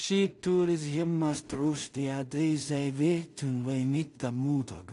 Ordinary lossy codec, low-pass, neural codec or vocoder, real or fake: AAC, 48 kbps; 10.8 kHz; codec, 16 kHz in and 24 kHz out, 0.4 kbps, LongCat-Audio-Codec, two codebook decoder; fake